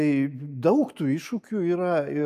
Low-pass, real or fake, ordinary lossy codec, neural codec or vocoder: 14.4 kHz; real; AAC, 96 kbps; none